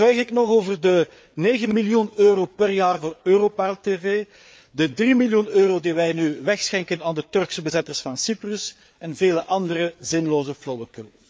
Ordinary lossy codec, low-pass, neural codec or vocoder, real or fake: none; none; codec, 16 kHz, 4 kbps, FreqCodec, larger model; fake